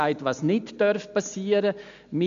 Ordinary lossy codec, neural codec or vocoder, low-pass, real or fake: MP3, 64 kbps; none; 7.2 kHz; real